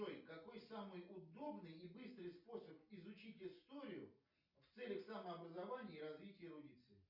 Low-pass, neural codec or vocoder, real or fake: 5.4 kHz; none; real